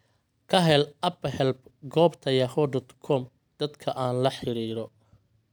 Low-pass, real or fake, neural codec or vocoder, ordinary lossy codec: none; real; none; none